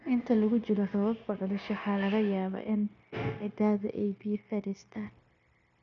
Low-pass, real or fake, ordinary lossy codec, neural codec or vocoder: 7.2 kHz; fake; none; codec, 16 kHz, 0.9 kbps, LongCat-Audio-Codec